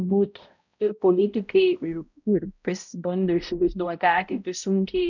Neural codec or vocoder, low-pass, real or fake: codec, 16 kHz, 0.5 kbps, X-Codec, HuBERT features, trained on balanced general audio; 7.2 kHz; fake